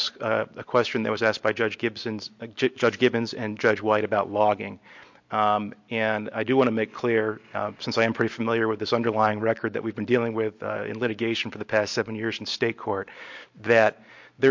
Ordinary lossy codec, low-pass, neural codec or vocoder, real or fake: MP3, 48 kbps; 7.2 kHz; none; real